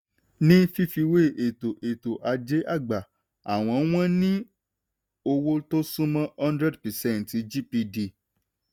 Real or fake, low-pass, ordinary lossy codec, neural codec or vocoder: real; none; none; none